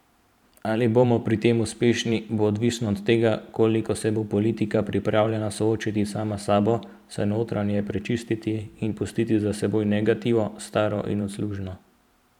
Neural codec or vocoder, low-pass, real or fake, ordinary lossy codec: vocoder, 44.1 kHz, 128 mel bands every 512 samples, BigVGAN v2; 19.8 kHz; fake; none